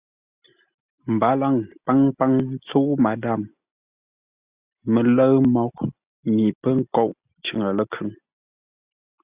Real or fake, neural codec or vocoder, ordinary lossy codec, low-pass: real; none; Opus, 64 kbps; 3.6 kHz